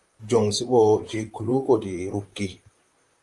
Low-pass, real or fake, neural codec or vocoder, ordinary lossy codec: 10.8 kHz; fake; vocoder, 44.1 kHz, 128 mel bands, Pupu-Vocoder; Opus, 32 kbps